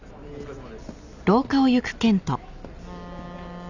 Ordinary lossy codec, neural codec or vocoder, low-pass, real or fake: none; none; 7.2 kHz; real